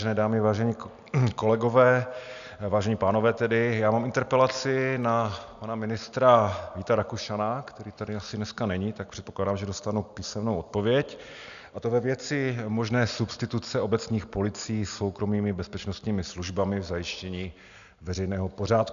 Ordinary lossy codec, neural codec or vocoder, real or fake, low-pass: AAC, 96 kbps; none; real; 7.2 kHz